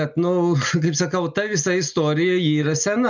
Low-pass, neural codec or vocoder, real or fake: 7.2 kHz; none; real